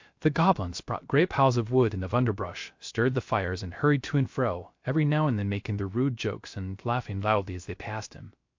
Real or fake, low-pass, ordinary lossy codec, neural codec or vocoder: fake; 7.2 kHz; MP3, 48 kbps; codec, 16 kHz, 0.3 kbps, FocalCodec